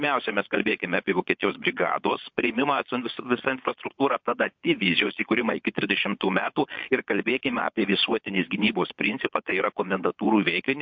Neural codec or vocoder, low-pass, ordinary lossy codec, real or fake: vocoder, 22.05 kHz, 80 mel bands, Vocos; 7.2 kHz; MP3, 48 kbps; fake